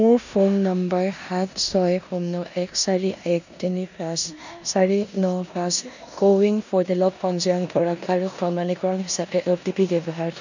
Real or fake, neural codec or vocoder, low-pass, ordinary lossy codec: fake; codec, 16 kHz in and 24 kHz out, 0.9 kbps, LongCat-Audio-Codec, four codebook decoder; 7.2 kHz; none